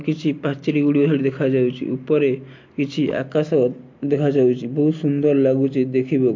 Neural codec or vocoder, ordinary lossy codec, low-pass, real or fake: none; MP3, 48 kbps; 7.2 kHz; real